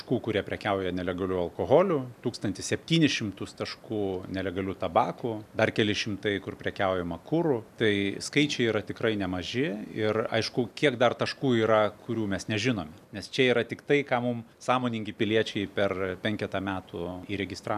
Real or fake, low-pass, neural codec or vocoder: real; 14.4 kHz; none